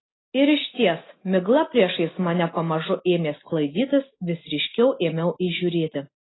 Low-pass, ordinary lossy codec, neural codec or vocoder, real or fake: 7.2 kHz; AAC, 16 kbps; none; real